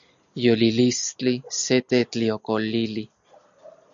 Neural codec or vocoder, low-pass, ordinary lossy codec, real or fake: none; 7.2 kHz; Opus, 64 kbps; real